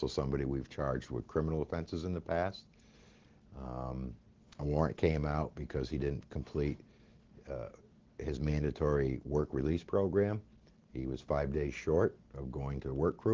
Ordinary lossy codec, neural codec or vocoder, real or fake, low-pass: Opus, 16 kbps; none; real; 7.2 kHz